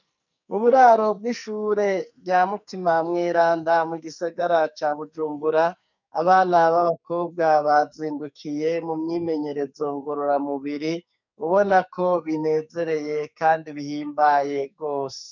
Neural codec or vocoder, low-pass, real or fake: codec, 44.1 kHz, 2.6 kbps, SNAC; 7.2 kHz; fake